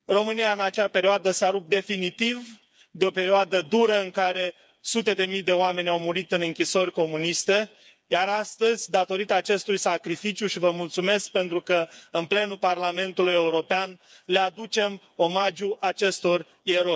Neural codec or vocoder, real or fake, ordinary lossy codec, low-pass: codec, 16 kHz, 4 kbps, FreqCodec, smaller model; fake; none; none